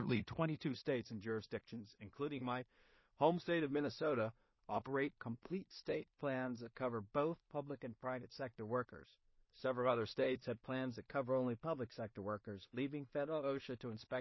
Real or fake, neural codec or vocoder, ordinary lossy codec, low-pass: fake; codec, 16 kHz in and 24 kHz out, 0.4 kbps, LongCat-Audio-Codec, two codebook decoder; MP3, 24 kbps; 7.2 kHz